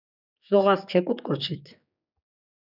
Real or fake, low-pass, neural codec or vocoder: fake; 5.4 kHz; codec, 16 kHz, 4 kbps, X-Codec, HuBERT features, trained on general audio